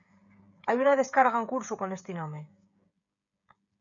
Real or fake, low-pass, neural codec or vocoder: fake; 7.2 kHz; codec, 16 kHz, 16 kbps, FreqCodec, smaller model